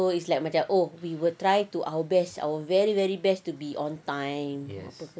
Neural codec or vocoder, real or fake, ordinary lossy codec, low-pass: none; real; none; none